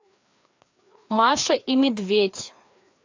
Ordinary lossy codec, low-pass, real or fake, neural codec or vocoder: AAC, 48 kbps; 7.2 kHz; fake; codec, 16 kHz, 2 kbps, FreqCodec, larger model